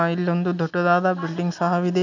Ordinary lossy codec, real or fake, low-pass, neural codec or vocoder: none; real; 7.2 kHz; none